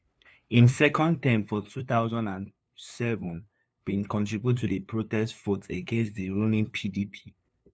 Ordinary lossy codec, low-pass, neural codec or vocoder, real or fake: none; none; codec, 16 kHz, 2 kbps, FunCodec, trained on LibriTTS, 25 frames a second; fake